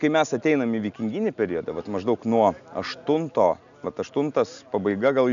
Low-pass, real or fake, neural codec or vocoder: 7.2 kHz; real; none